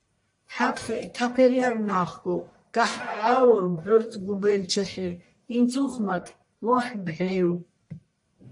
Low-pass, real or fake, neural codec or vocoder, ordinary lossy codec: 10.8 kHz; fake; codec, 44.1 kHz, 1.7 kbps, Pupu-Codec; AAC, 64 kbps